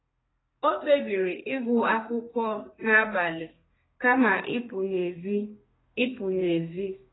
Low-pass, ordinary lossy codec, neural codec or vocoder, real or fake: 7.2 kHz; AAC, 16 kbps; codec, 32 kHz, 1.9 kbps, SNAC; fake